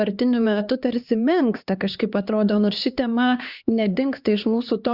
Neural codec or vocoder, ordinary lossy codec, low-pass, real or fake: codec, 16 kHz, 2 kbps, X-Codec, HuBERT features, trained on LibriSpeech; Opus, 64 kbps; 5.4 kHz; fake